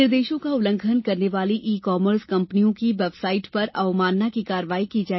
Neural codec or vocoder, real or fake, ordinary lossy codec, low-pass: none; real; MP3, 24 kbps; 7.2 kHz